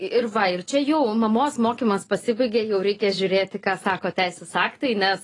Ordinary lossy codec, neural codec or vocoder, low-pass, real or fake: AAC, 32 kbps; none; 10.8 kHz; real